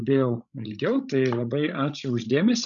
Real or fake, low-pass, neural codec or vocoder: fake; 7.2 kHz; codec, 16 kHz, 16 kbps, FreqCodec, larger model